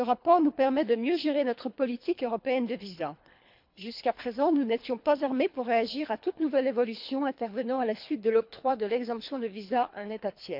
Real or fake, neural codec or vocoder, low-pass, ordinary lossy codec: fake; codec, 24 kHz, 3 kbps, HILCodec; 5.4 kHz; MP3, 48 kbps